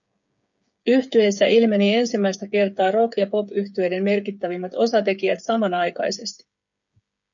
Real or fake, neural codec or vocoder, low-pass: fake; codec, 16 kHz, 8 kbps, FreqCodec, smaller model; 7.2 kHz